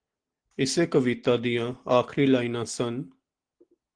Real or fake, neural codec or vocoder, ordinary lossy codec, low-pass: real; none; Opus, 16 kbps; 9.9 kHz